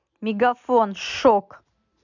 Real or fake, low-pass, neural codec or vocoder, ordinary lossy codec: real; 7.2 kHz; none; none